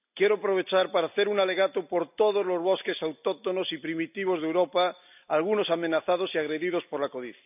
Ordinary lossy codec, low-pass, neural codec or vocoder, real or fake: none; 3.6 kHz; none; real